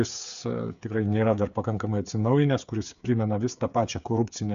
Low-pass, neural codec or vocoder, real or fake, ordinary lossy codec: 7.2 kHz; codec, 16 kHz, 8 kbps, FreqCodec, smaller model; fake; MP3, 96 kbps